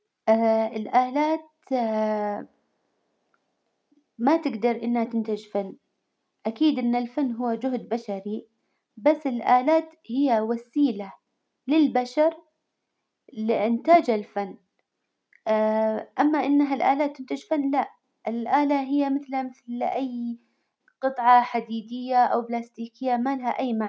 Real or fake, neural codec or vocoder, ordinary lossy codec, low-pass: real; none; none; none